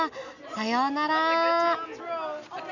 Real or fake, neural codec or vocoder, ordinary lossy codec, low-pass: real; none; none; 7.2 kHz